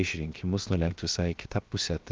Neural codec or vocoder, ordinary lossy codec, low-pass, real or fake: codec, 16 kHz, 0.7 kbps, FocalCodec; Opus, 32 kbps; 7.2 kHz; fake